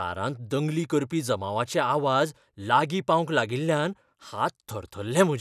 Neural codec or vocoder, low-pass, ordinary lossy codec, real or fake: none; 14.4 kHz; none; real